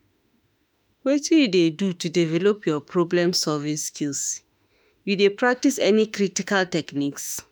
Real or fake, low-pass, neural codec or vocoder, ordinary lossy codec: fake; none; autoencoder, 48 kHz, 32 numbers a frame, DAC-VAE, trained on Japanese speech; none